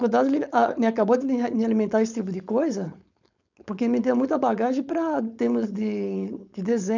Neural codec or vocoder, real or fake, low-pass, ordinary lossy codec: codec, 16 kHz, 4.8 kbps, FACodec; fake; 7.2 kHz; none